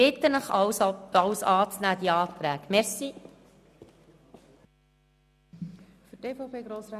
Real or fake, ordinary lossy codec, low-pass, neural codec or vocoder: real; none; 14.4 kHz; none